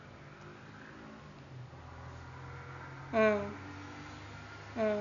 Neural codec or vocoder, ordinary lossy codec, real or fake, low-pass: none; none; real; 7.2 kHz